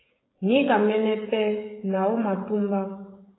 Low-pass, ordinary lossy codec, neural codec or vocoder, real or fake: 7.2 kHz; AAC, 16 kbps; codec, 16 kHz, 16 kbps, FreqCodec, smaller model; fake